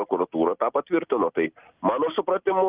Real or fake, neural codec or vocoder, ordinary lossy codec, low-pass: real; none; Opus, 32 kbps; 3.6 kHz